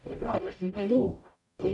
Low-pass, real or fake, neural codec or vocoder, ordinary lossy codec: 10.8 kHz; fake; codec, 44.1 kHz, 0.9 kbps, DAC; none